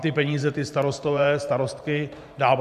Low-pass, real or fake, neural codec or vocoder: 14.4 kHz; fake; vocoder, 44.1 kHz, 128 mel bands every 512 samples, BigVGAN v2